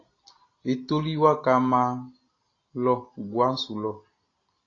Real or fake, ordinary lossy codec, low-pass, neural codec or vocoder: real; AAC, 32 kbps; 7.2 kHz; none